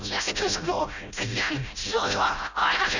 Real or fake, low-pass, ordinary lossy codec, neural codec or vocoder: fake; 7.2 kHz; none; codec, 16 kHz, 0.5 kbps, FreqCodec, smaller model